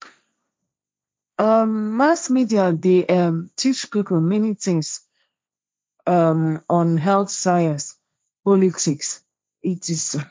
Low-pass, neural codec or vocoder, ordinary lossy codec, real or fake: none; codec, 16 kHz, 1.1 kbps, Voila-Tokenizer; none; fake